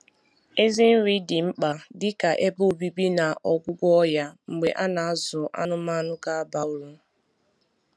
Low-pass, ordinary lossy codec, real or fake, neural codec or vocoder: none; none; real; none